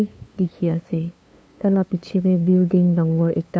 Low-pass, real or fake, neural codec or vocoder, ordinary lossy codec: none; fake; codec, 16 kHz, 2 kbps, FunCodec, trained on LibriTTS, 25 frames a second; none